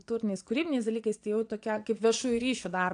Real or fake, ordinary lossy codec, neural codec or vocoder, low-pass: fake; AAC, 64 kbps; vocoder, 22.05 kHz, 80 mel bands, Vocos; 9.9 kHz